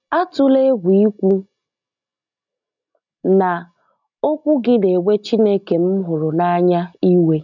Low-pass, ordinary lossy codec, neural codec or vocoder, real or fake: 7.2 kHz; none; none; real